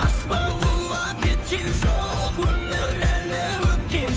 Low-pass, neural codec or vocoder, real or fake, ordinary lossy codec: none; codec, 16 kHz, 2 kbps, FunCodec, trained on Chinese and English, 25 frames a second; fake; none